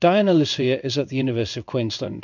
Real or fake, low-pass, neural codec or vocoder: fake; 7.2 kHz; codec, 16 kHz in and 24 kHz out, 1 kbps, XY-Tokenizer